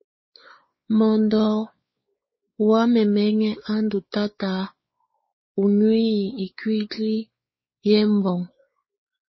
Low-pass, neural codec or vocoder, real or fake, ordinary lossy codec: 7.2 kHz; codec, 16 kHz, 4 kbps, X-Codec, WavLM features, trained on Multilingual LibriSpeech; fake; MP3, 24 kbps